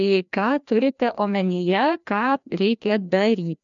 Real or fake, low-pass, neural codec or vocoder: fake; 7.2 kHz; codec, 16 kHz, 1 kbps, FreqCodec, larger model